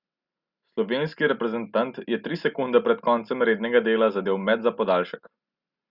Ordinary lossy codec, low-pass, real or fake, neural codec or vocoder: Opus, 64 kbps; 5.4 kHz; real; none